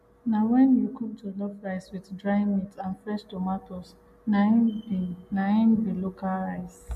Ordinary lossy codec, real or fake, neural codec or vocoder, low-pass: MP3, 64 kbps; real; none; 14.4 kHz